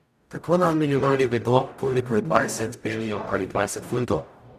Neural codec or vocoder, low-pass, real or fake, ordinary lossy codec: codec, 44.1 kHz, 0.9 kbps, DAC; 14.4 kHz; fake; none